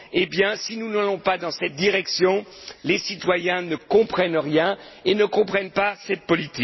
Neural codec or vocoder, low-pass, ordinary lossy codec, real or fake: none; 7.2 kHz; MP3, 24 kbps; real